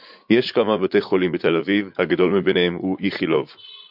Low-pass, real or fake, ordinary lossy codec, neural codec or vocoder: 5.4 kHz; fake; MP3, 48 kbps; vocoder, 44.1 kHz, 80 mel bands, Vocos